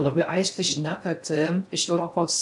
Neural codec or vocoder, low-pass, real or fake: codec, 16 kHz in and 24 kHz out, 0.6 kbps, FocalCodec, streaming, 4096 codes; 10.8 kHz; fake